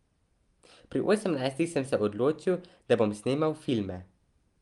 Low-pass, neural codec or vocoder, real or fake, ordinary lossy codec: 10.8 kHz; none; real; Opus, 32 kbps